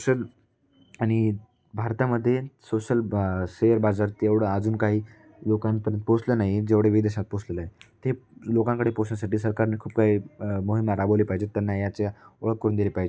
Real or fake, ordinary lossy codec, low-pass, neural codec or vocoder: real; none; none; none